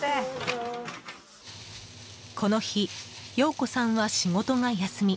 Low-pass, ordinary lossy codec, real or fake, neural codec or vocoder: none; none; real; none